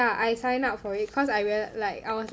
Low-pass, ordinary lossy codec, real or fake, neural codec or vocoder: none; none; real; none